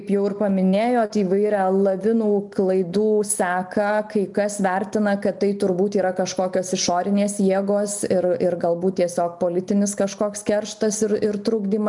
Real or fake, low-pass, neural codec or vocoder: real; 10.8 kHz; none